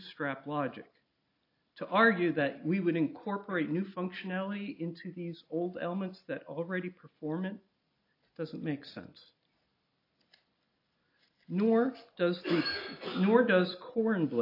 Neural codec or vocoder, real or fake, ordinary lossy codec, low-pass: none; real; AAC, 32 kbps; 5.4 kHz